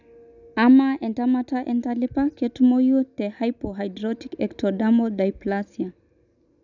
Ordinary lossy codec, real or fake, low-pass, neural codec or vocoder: none; real; 7.2 kHz; none